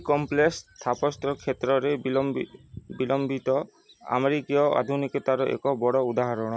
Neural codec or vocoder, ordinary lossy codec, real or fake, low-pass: none; none; real; none